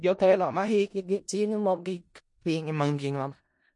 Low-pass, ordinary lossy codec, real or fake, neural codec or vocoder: 10.8 kHz; MP3, 48 kbps; fake; codec, 16 kHz in and 24 kHz out, 0.4 kbps, LongCat-Audio-Codec, four codebook decoder